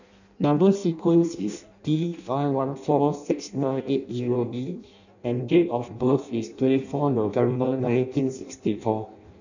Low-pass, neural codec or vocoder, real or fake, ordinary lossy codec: 7.2 kHz; codec, 16 kHz in and 24 kHz out, 0.6 kbps, FireRedTTS-2 codec; fake; none